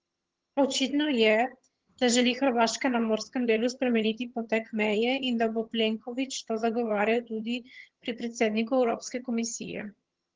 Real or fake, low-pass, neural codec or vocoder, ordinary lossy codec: fake; 7.2 kHz; vocoder, 22.05 kHz, 80 mel bands, HiFi-GAN; Opus, 16 kbps